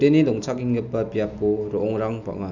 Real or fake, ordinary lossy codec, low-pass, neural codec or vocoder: real; none; 7.2 kHz; none